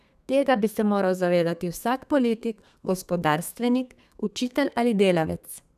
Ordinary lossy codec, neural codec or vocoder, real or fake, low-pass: none; codec, 32 kHz, 1.9 kbps, SNAC; fake; 14.4 kHz